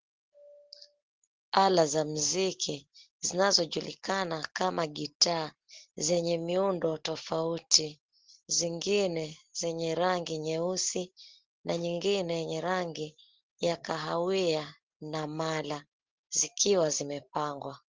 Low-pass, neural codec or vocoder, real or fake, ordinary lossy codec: 7.2 kHz; none; real; Opus, 16 kbps